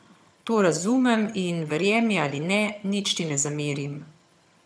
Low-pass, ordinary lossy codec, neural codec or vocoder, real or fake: none; none; vocoder, 22.05 kHz, 80 mel bands, HiFi-GAN; fake